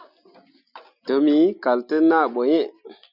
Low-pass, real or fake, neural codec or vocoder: 5.4 kHz; real; none